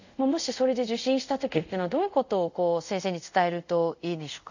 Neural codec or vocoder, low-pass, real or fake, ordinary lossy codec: codec, 24 kHz, 0.5 kbps, DualCodec; 7.2 kHz; fake; none